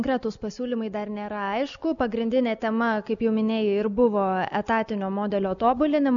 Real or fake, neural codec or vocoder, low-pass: real; none; 7.2 kHz